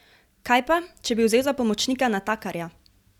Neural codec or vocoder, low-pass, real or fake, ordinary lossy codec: none; 19.8 kHz; real; none